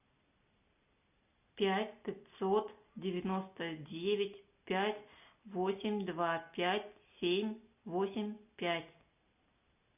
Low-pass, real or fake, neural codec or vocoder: 3.6 kHz; real; none